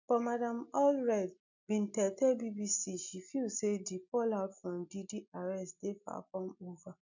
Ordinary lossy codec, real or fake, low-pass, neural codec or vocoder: none; real; 7.2 kHz; none